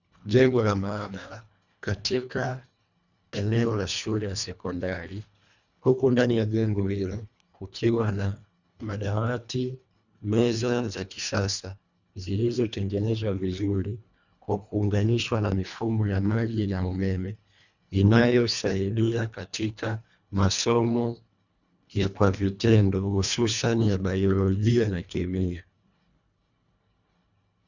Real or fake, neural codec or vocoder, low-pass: fake; codec, 24 kHz, 1.5 kbps, HILCodec; 7.2 kHz